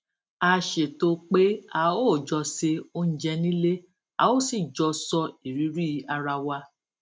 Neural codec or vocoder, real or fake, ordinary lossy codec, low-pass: none; real; none; none